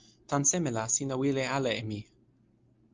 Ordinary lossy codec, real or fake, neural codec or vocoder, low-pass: Opus, 24 kbps; real; none; 7.2 kHz